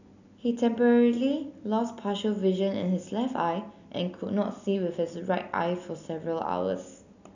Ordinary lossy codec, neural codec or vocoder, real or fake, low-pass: none; none; real; 7.2 kHz